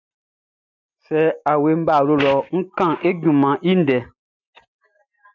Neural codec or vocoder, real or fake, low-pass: none; real; 7.2 kHz